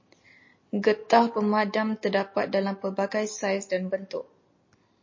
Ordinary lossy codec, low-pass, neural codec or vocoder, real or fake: MP3, 32 kbps; 7.2 kHz; none; real